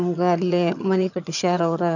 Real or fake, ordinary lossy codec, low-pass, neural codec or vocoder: fake; none; 7.2 kHz; vocoder, 22.05 kHz, 80 mel bands, HiFi-GAN